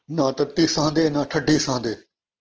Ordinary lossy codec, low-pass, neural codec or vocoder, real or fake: Opus, 16 kbps; 7.2 kHz; none; real